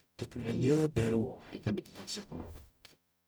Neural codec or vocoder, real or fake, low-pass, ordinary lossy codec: codec, 44.1 kHz, 0.9 kbps, DAC; fake; none; none